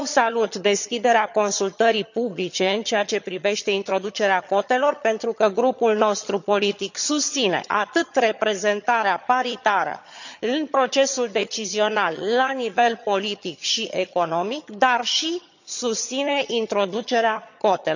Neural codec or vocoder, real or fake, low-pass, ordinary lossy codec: vocoder, 22.05 kHz, 80 mel bands, HiFi-GAN; fake; 7.2 kHz; none